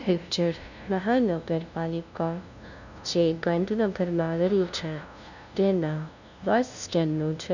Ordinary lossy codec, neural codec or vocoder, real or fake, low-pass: none; codec, 16 kHz, 0.5 kbps, FunCodec, trained on LibriTTS, 25 frames a second; fake; 7.2 kHz